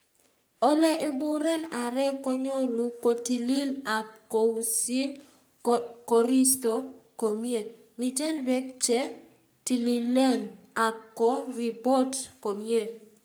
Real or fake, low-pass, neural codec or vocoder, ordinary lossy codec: fake; none; codec, 44.1 kHz, 3.4 kbps, Pupu-Codec; none